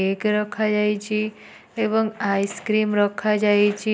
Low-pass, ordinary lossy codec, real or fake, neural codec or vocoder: none; none; real; none